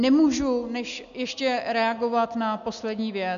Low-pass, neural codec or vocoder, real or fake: 7.2 kHz; none; real